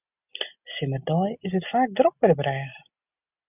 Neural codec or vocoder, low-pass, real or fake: none; 3.6 kHz; real